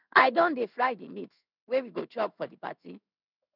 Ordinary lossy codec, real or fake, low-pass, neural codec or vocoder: none; fake; 5.4 kHz; codec, 16 kHz in and 24 kHz out, 1 kbps, XY-Tokenizer